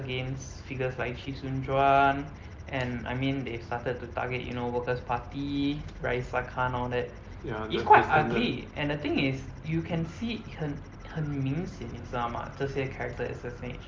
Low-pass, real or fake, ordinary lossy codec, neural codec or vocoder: 7.2 kHz; real; Opus, 32 kbps; none